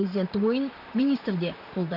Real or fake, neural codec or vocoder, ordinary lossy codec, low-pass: fake; vocoder, 44.1 kHz, 128 mel bands, Pupu-Vocoder; none; 5.4 kHz